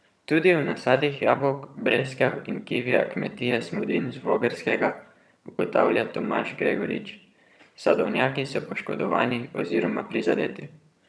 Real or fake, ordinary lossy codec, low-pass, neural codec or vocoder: fake; none; none; vocoder, 22.05 kHz, 80 mel bands, HiFi-GAN